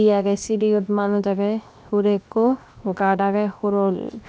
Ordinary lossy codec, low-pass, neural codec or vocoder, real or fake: none; none; codec, 16 kHz, 0.7 kbps, FocalCodec; fake